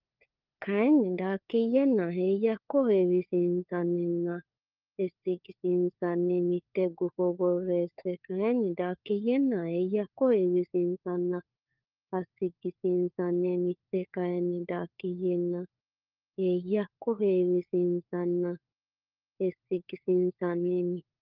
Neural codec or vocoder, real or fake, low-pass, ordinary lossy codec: codec, 16 kHz, 4 kbps, FunCodec, trained on LibriTTS, 50 frames a second; fake; 5.4 kHz; Opus, 24 kbps